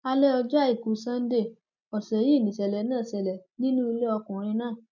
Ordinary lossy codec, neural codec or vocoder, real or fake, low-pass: none; none; real; 7.2 kHz